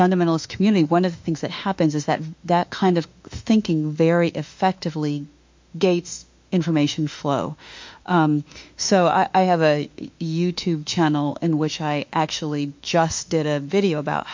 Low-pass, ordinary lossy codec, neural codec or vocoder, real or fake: 7.2 kHz; MP3, 48 kbps; autoencoder, 48 kHz, 32 numbers a frame, DAC-VAE, trained on Japanese speech; fake